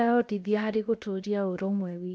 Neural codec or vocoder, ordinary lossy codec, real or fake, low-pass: codec, 16 kHz, 0.7 kbps, FocalCodec; none; fake; none